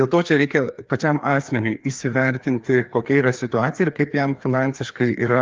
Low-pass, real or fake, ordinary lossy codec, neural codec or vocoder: 7.2 kHz; fake; Opus, 32 kbps; codec, 16 kHz, 2 kbps, FreqCodec, larger model